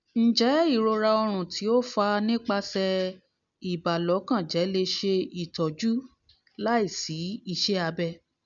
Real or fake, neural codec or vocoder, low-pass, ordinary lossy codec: real; none; 7.2 kHz; none